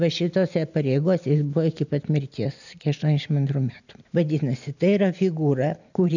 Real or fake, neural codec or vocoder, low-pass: real; none; 7.2 kHz